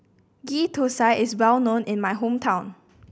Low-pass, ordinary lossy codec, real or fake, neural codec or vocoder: none; none; real; none